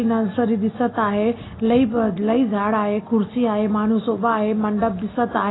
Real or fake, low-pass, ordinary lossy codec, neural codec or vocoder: real; 7.2 kHz; AAC, 16 kbps; none